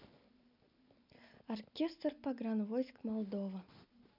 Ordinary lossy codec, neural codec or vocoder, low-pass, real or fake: none; none; 5.4 kHz; real